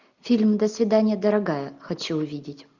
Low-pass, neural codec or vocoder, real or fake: 7.2 kHz; none; real